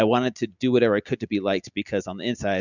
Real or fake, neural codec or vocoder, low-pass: real; none; 7.2 kHz